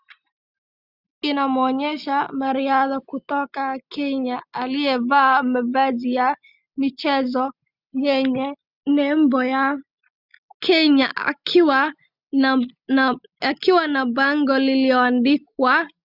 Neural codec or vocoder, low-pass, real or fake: none; 5.4 kHz; real